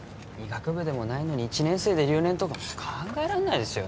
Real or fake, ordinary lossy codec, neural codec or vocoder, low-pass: real; none; none; none